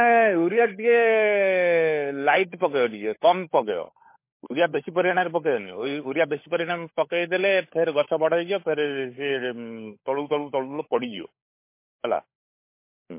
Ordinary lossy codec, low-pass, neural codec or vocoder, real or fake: MP3, 24 kbps; 3.6 kHz; codec, 16 kHz, 16 kbps, FunCodec, trained on LibriTTS, 50 frames a second; fake